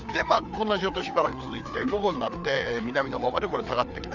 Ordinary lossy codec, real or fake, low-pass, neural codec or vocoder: none; fake; 7.2 kHz; codec, 16 kHz, 4 kbps, FreqCodec, larger model